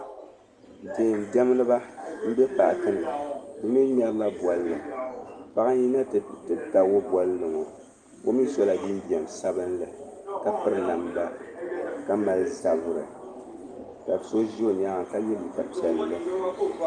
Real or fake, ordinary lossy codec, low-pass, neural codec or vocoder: real; Opus, 32 kbps; 9.9 kHz; none